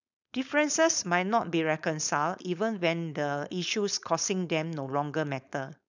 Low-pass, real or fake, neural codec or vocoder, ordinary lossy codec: 7.2 kHz; fake; codec, 16 kHz, 4.8 kbps, FACodec; none